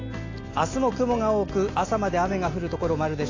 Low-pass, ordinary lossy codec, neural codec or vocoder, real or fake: 7.2 kHz; AAC, 32 kbps; none; real